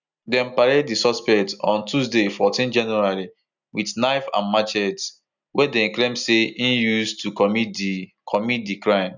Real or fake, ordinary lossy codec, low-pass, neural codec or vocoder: real; none; 7.2 kHz; none